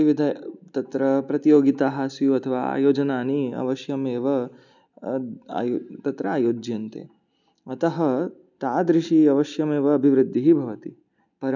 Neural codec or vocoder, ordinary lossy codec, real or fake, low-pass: none; none; real; 7.2 kHz